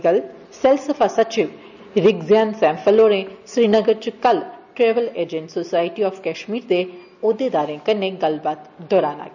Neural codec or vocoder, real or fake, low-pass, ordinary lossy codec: none; real; 7.2 kHz; none